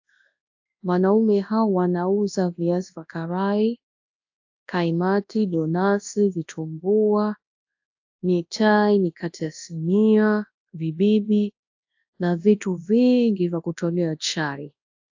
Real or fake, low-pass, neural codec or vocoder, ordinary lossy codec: fake; 7.2 kHz; codec, 24 kHz, 0.9 kbps, WavTokenizer, large speech release; AAC, 48 kbps